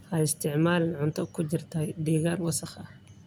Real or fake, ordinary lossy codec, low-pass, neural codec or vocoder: real; none; none; none